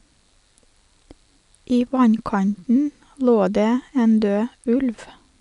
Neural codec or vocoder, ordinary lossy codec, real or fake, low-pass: none; none; real; 10.8 kHz